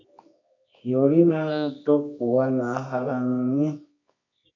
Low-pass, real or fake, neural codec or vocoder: 7.2 kHz; fake; codec, 24 kHz, 0.9 kbps, WavTokenizer, medium music audio release